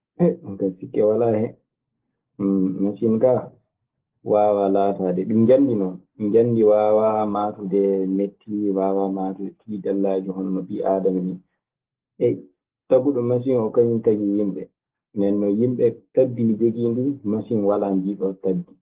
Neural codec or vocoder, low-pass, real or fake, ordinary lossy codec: none; 3.6 kHz; real; Opus, 16 kbps